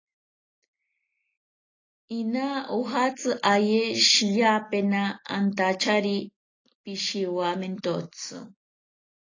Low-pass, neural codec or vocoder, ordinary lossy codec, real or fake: 7.2 kHz; none; AAC, 32 kbps; real